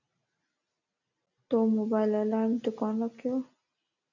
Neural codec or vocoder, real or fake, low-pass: none; real; 7.2 kHz